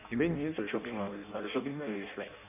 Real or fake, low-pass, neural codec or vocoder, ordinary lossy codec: fake; 3.6 kHz; codec, 16 kHz in and 24 kHz out, 0.6 kbps, FireRedTTS-2 codec; none